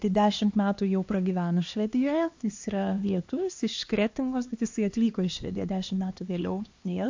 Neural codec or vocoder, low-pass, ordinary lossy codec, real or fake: codec, 16 kHz, 2 kbps, X-Codec, HuBERT features, trained on LibriSpeech; 7.2 kHz; AAC, 48 kbps; fake